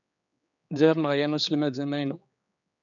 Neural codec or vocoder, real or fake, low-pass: codec, 16 kHz, 4 kbps, X-Codec, HuBERT features, trained on general audio; fake; 7.2 kHz